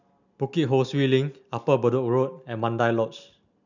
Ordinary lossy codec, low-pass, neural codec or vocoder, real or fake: none; 7.2 kHz; none; real